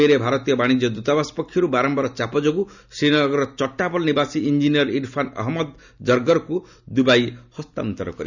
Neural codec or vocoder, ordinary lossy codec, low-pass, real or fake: none; none; 7.2 kHz; real